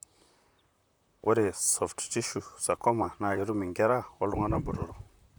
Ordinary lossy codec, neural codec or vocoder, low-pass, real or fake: none; vocoder, 44.1 kHz, 128 mel bands, Pupu-Vocoder; none; fake